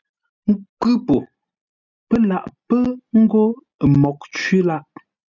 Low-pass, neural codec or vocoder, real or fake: 7.2 kHz; none; real